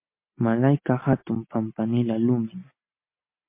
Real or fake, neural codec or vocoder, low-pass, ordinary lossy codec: real; none; 3.6 kHz; MP3, 24 kbps